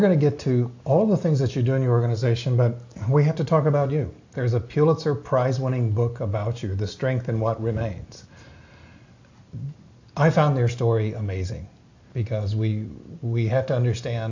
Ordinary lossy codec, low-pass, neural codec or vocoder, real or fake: AAC, 48 kbps; 7.2 kHz; none; real